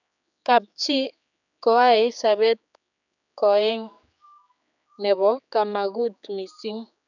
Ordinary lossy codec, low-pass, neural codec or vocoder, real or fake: none; 7.2 kHz; codec, 16 kHz, 4 kbps, X-Codec, HuBERT features, trained on general audio; fake